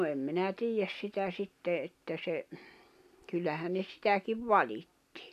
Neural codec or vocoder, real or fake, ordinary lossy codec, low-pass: none; real; none; 14.4 kHz